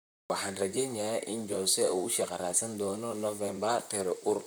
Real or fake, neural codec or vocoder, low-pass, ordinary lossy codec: fake; vocoder, 44.1 kHz, 128 mel bands, Pupu-Vocoder; none; none